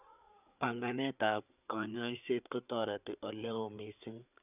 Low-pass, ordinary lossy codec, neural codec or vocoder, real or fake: 3.6 kHz; none; codec, 16 kHz, 4 kbps, FreqCodec, larger model; fake